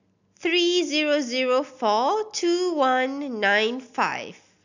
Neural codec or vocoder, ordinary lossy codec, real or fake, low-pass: none; none; real; 7.2 kHz